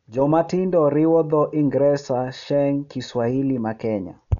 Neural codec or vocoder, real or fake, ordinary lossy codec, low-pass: none; real; none; 7.2 kHz